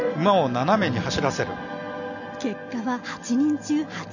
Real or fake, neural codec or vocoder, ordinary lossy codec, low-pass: real; none; none; 7.2 kHz